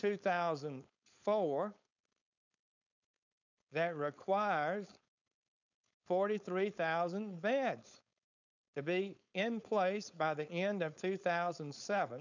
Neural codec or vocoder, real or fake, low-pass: codec, 16 kHz, 4.8 kbps, FACodec; fake; 7.2 kHz